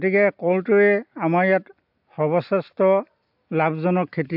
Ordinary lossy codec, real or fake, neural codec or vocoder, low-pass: AAC, 48 kbps; real; none; 5.4 kHz